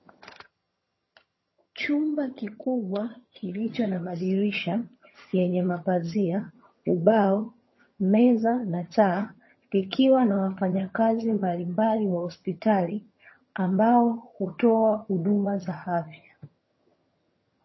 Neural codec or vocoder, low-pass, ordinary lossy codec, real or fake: vocoder, 22.05 kHz, 80 mel bands, HiFi-GAN; 7.2 kHz; MP3, 24 kbps; fake